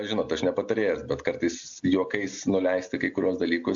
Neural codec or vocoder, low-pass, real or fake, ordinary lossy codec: none; 7.2 kHz; real; AAC, 64 kbps